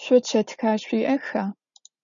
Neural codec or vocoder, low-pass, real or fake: none; 7.2 kHz; real